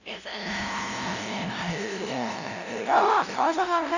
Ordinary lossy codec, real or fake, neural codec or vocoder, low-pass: none; fake; codec, 16 kHz, 0.5 kbps, FunCodec, trained on LibriTTS, 25 frames a second; 7.2 kHz